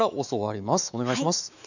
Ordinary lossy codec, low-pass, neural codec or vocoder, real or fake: none; 7.2 kHz; autoencoder, 48 kHz, 128 numbers a frame, DAC-VAE, trained on Japanese speech; fake